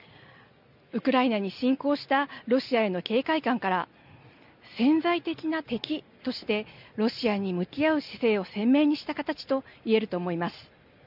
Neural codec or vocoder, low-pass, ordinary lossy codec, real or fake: none; 5.4 kHz; none; real